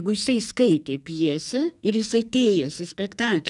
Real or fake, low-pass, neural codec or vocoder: fake; 10.8 kHz; codec, 44.1 kHz, 2.6 kbps, SNAC